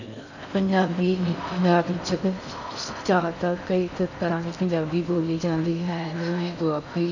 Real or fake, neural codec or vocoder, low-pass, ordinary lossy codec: fake; codec, 16 kHz in and 24 kHz out, 0.6 kbps, FocalCodec, streaming, 4096 codes; 7.2 kHz; none